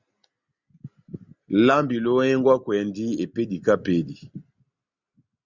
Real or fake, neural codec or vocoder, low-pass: real; none; 7.2 kHz